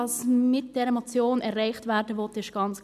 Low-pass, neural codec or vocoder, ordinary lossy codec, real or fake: 14.4 kHz; none; none; real